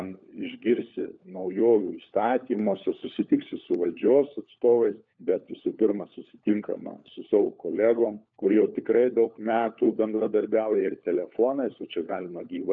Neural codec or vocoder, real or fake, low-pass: codec, 16 kHz, 4 kbps, FunCodec, trained on LibriTTS, 50 frames a second; fake; 7.2 kHz